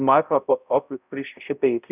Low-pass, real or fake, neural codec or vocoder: 3.6 kHz; fake; codec, 16 kHz, 0.5 kbps, X-Codec, HuBERT features, trained on balanced general audio